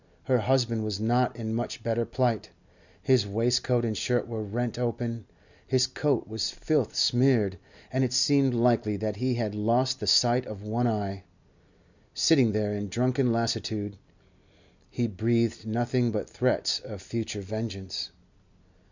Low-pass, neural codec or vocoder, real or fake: 7.2 kHz; none; real